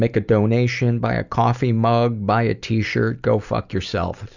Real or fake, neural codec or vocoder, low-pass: real; none; 7.2 kHz